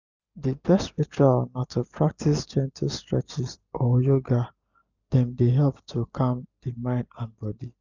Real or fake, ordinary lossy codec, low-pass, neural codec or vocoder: real; none; 7.2 kHz; none